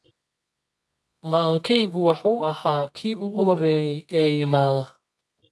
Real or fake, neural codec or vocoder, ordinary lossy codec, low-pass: fake; codec, 24 kHz, 0.9 kbps, WavTokenizer, medium music audio release; none; none